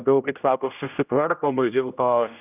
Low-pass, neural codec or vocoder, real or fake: 3.6 kHz; codec, 16 kHz, 0.5 kbps, X-Codec, HuBERT features, trained on general audio; fake